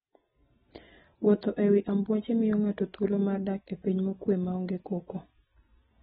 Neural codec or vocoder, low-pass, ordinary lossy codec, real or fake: none; 19.8 kHz; AAC, 16 kbps; real